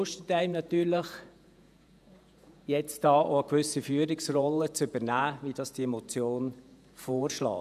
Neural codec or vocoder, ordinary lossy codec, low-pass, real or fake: vocoder, 48 kHz, 128 mel bands, Vocos; none; 14.4 kHz; fake